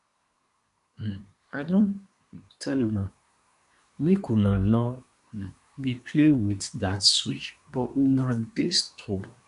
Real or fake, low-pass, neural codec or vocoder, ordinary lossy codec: fake; 10.8 kHz; codec, 24 kHz, 1 kbps, SNAC; none